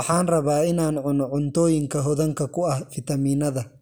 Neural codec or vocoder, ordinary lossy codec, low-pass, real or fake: none; none; none; real